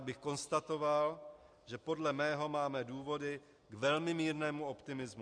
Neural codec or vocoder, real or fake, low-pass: none; real; 9.9 kHz